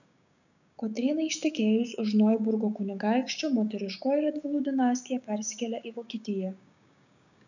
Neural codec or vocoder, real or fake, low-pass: codec, 16 kHz, 6 kbps, DAC; fake; 7.2 kHz